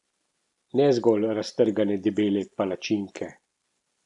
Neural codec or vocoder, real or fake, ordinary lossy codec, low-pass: none; real; none; 10.8 kHz